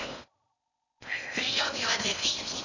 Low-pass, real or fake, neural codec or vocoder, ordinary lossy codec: 7.2 kHz; fake; codec, 16 kHz in and 24 kHz out, 0.6 kbps, FocalCodec, streaming, 4096 codes; none